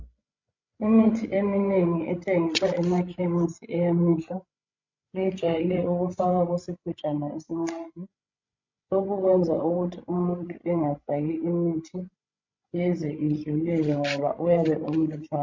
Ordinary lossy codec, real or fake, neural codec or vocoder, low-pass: MP3, 48 kbps; fake; codec, 16 kHz, 16 kbps, FreqCodec, larger model; 7.2 kHz